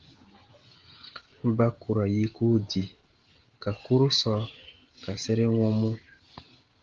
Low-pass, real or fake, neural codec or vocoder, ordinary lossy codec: 7.2 kHz; fake; codec, 16 kHz, 16 kbps, FreqCodec, smaller model; Opus, 16 kbps